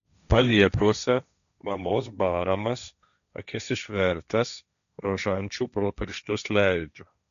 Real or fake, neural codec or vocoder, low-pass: fake; codec, 16 kHz, 1.1 kbps, Voila-Tokenizer; 7.2 kHz